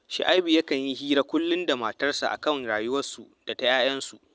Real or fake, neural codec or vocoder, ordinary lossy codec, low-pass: real; none; none; none